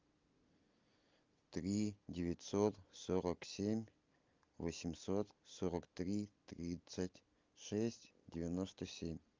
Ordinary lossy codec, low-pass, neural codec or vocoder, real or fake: Opus, 24 kbps; 7.2 kHz; none; real